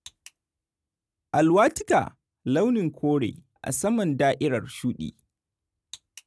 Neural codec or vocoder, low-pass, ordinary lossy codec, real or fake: none; none; none; real